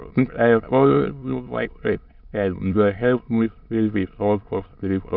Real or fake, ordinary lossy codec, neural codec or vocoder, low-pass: fake; none; autoencoder, 22.05 kHz, a latent of 192 numbers a frame, VITS, trained on many speakers; 5.4 kHz